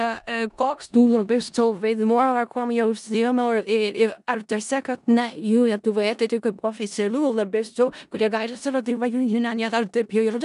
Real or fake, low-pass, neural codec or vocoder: fake; 10.8 kHz; codec, 16 kHz in and 24 kHz out, 0.4 kbps, LongCat-Audio-Codec, four codebook decoder